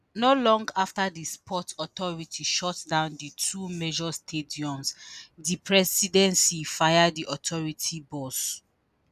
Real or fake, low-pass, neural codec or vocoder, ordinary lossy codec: real; 14.4 kHz; none; none